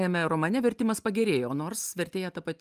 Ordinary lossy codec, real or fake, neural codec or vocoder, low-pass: Opus, 32 kbps; real; none; 14.4 kHz